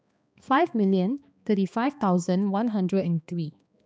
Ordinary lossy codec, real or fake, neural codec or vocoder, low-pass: none; fake; codec, 16 kHz, 2 kbps, X-Codec, HuBERT features, trained on balanced general audio; none